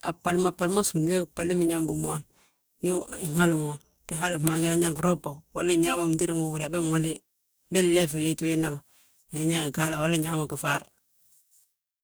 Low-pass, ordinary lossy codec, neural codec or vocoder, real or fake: none; none; codec, 44.1 kHz, 2.6 kbps, DAC; fake